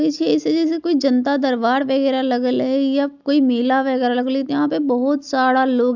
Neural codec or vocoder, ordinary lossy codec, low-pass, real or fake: none; none; 7.2 kHz; real